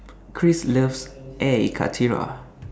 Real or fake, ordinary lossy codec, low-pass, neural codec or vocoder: real; none; none; none